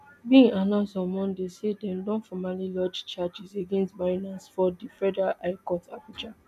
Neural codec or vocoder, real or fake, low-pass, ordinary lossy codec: none; real; 14.4 kHz; none